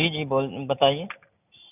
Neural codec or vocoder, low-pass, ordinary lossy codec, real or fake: none; 3.6 kHz; none; real